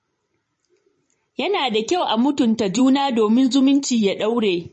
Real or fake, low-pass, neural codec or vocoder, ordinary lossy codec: fake; 10.8 kHz; vocoder, 44.1 kHz, 128 mel bands every 512 samples, BigVGAN v2; MP3, 32 kbps